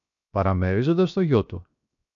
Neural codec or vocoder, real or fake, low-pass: codec, 16 kHz, 0.7 kbps, FocalCodec; fake; 7.2 kHz